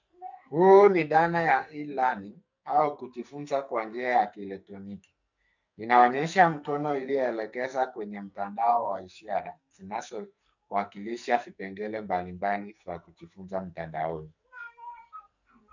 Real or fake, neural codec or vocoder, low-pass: fake; codec, 44.1 kHz, 2.6 kbps, SNAC; 7.2 kHz